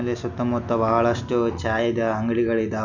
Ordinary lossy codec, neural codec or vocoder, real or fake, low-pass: none; none; real; 7.2 kHz